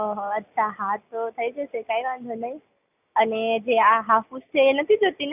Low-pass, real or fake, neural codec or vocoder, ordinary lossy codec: 3.6 kHz; real; none; none